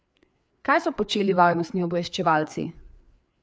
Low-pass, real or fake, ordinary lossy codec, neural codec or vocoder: none; fake; none; codec, 16 kHz, 4 kbps, FreqCodec, larger model